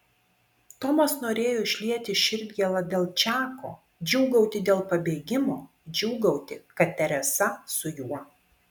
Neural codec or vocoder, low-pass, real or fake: none; 19.8 kHz; real